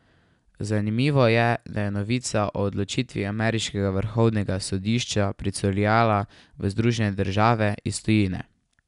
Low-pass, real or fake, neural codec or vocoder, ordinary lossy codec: 10.8 kHz; real; none; none